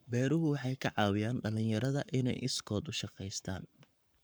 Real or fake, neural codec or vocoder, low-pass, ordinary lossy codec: fake; codec, 44.1 kHz, 7.8 kbps, Pupu-Codec; none; none